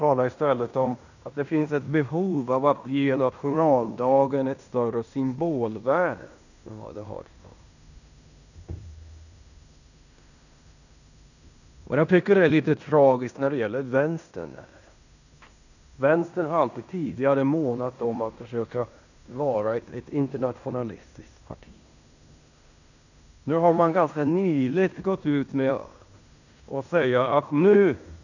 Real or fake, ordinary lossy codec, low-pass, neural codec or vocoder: fake; none; 7.2 kHz; codec, 16 kHz in and 24 kHz out, 0.9 kbps, LongCat-Audio-Codec, fine tuned four codebook decoder